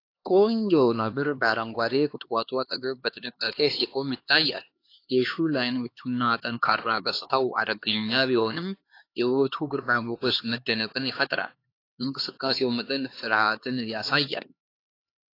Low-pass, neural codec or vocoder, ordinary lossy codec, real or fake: 5.4 kHz; codec, 16 kHz, 2 kbps, X-Codec, HuBERT features, trained on LibriSpeech; AAC, 32 kbps; fake